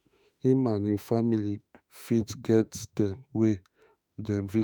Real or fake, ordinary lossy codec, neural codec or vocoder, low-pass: fake; none; autoencoder, 48 kHz, 32 numbers a frame, DAC-VAE, trained on Japanese speech; none